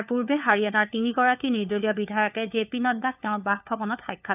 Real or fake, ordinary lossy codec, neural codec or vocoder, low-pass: fake; none; codec, 16 kHz, 4 kbps, FunCodec, trained on LibriTTS, 50 frames a second; 3.6 kHz